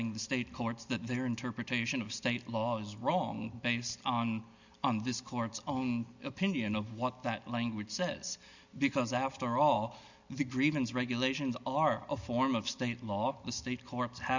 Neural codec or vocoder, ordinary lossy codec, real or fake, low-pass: none; Opus, 64 kbps; real; 7.2 kHz